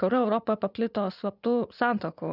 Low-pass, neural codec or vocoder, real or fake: 5.4 kHz; vocoder, 24 kHz, 100 mel bands, Vocos; fake